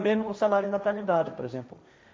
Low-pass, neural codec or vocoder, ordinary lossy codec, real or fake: none; codec, 16 kHz, 1.1 kbps, Voila-Tokenizer; none; fake